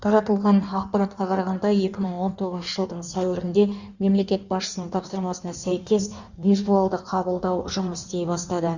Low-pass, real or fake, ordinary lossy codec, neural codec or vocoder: 7.2 kHz; fake; Opus, 64 kbps; codec, 16 kHz in and 24 kHz out, 1.1 kbps, FireRedTTS-2 codec